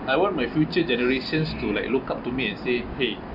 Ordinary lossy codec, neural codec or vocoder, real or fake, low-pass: none; none; real; 5.4 kHz